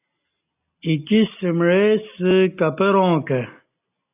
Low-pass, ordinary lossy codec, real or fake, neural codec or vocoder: 3.6 kHz; AAC, 32 kbps; real; none